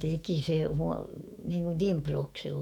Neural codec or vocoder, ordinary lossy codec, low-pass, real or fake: autoencoder, 48 kHz, 128 numbers a frame, DAC-VAE, trained on Japanese speech; none; 19.8 kHz; fake